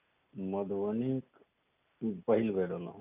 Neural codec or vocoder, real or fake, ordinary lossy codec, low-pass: none; real; none; 3.6 kHz